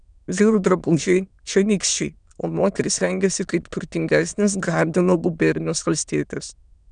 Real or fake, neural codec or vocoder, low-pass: fake; autoencoder, 22.05 kHz, a latent of 192 numbers a frame, VITS, trained on many speakers; 9.9 kHz